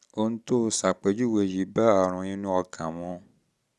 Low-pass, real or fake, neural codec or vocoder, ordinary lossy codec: none; real; none; none